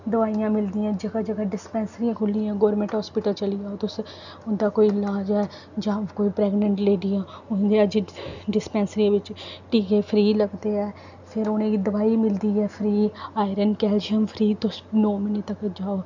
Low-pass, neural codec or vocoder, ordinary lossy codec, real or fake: 7.2 kHz; none; none; real